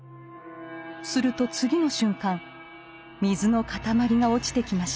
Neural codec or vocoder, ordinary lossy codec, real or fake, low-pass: none; none; real; none